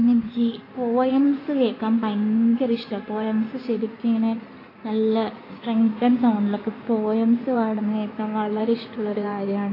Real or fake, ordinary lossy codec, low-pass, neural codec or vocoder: fake; AAC, 24 kbps; 5.4 kHz; codec, 16 kHz in and 24 kHz out, 2.2 kbps, FireRedTTS-2 codec